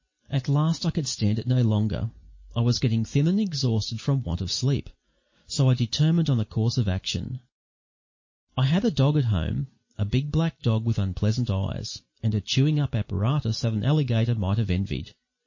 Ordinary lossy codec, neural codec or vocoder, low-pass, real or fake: MP3, 32 kbps; none; 7.2 kHz; real